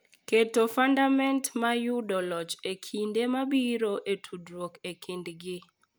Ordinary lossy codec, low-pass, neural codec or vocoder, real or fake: none; none; none; real